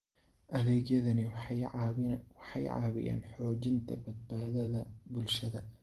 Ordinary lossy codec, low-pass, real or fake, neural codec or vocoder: Opus, 24 kbps; 14.4 kHz; fake; vocoder, 44.1 kHz, 128 mel bands every 512 samples, BigVGAN v2